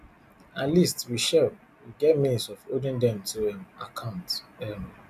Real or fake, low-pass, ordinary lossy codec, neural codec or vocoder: real; 14.4 kHz; none; none